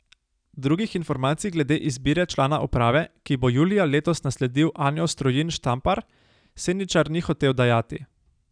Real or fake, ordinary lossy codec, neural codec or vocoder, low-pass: real; none; none; 9.9 kHz